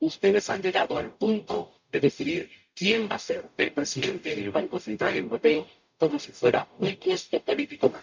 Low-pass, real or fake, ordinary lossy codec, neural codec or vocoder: 7.2 kHz; fake; MP3, 64 kbps; codec, 44.1 kHz, 0.9 kbps, DAC